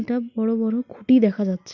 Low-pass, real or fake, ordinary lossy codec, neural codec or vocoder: 7.2 kHz; real; none; none